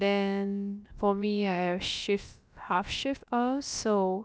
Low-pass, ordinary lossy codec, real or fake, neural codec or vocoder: none; none; fake; codec, 16 kHz, about 1 kbps, DyCAST, with the encoder's durations